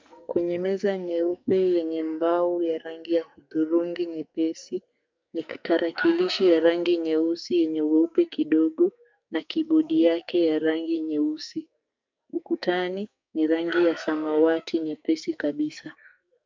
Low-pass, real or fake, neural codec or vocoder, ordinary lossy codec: 7.2 kHz; fake; codec, 44.1 kHz, 2.6 kbps, SNAC; MP3, 64 kbps